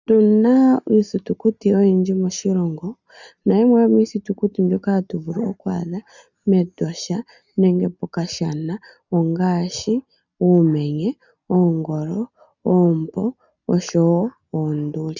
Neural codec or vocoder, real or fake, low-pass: none; real; 7.2 kHz